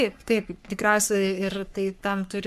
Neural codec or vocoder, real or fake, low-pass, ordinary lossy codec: codec, 44.1 kHz, 3.4 kbps, Pupu-Codec; fake; 14.4 kHz; AAC, 96 kbps